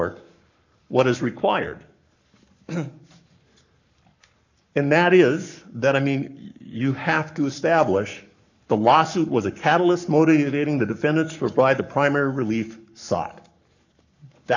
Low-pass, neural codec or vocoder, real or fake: 7.2 kHz; codec, 44.1 kHz, 7.8 kbps, Pupu-Codec; fake